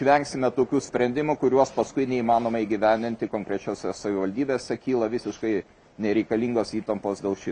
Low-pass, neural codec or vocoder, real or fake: 9.9 kHz; none; real